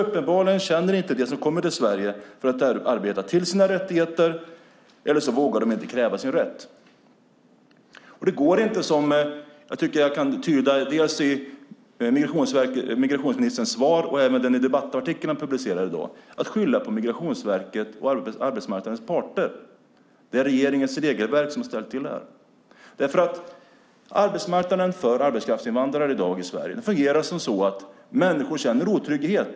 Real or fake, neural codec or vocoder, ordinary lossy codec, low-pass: real; none; none; none